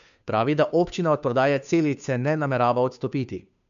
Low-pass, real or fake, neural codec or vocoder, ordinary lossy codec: 7.2 kHz; fake; codec, 16 kHz, 2 kbps, FunCodec, trained on Chinese and English, 25 frames a second; none